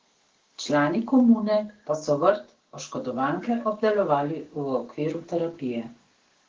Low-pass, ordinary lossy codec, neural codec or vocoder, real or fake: 7.2 kHz; Opus, 16 kbps; codec, 16 kHz, 6 kbps, DAC; fake